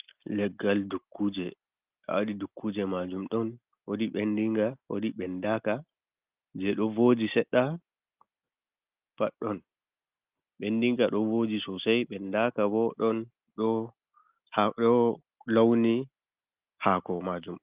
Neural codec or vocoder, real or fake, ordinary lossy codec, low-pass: none; real; Opus, 32 kbps; 3.6 kHz